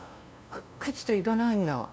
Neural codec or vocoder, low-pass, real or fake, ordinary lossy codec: codec, 16 kHz, 0.5 kbps, FunCodec, trained on LibriTTS, 25 frames a second; none; fake; none